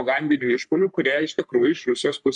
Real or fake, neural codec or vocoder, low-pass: fake; codec, 44.1 kHz, 3.4 kbps, Pupu-Codec; 10.8 kHz